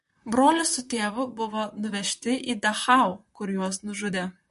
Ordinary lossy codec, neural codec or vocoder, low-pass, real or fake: MP3, 48 kbps; vocoder, 44.1 kHz, 128 mel bands, Pupu-Vocoder; 14.4 kHz; fake